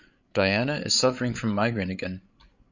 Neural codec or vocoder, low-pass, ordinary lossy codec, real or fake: codec, 16 kHz, 8 kbps, FreqCodec, larger model; 7.2 kHz; Opus, 64 kbps; fake